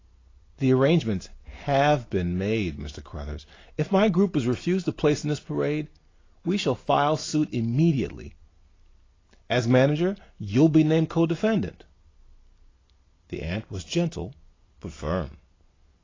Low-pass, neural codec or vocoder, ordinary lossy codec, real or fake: 7.2 kHz; none; AAC, 32 kbps; real